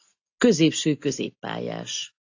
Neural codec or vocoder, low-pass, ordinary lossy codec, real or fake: none; 7.2 kHz; AAC, 48 kbps; real